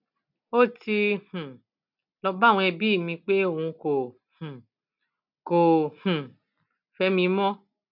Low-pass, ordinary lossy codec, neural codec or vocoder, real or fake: 5.4 kHz; none; none; real